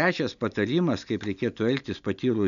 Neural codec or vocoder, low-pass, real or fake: none; 7.2 kHz; real